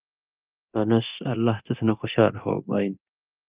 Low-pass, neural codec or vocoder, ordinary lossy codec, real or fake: 3.6 kHz; codec, 24 kHz, 0.9 kbps, DualCodec; Opus, 24 kbps; fake